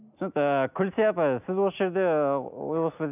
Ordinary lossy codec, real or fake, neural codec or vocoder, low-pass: none; real; none; 3.6 kHz